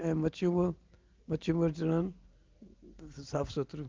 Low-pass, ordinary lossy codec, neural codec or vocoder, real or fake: 7.2 kHz; Opus, 24 kbps; none; real